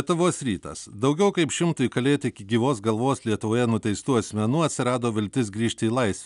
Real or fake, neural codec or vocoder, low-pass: real; none; 10.8 kHz